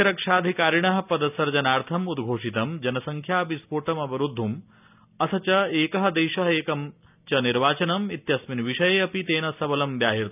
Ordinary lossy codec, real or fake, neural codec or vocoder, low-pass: none; real; none; 3.6 kHz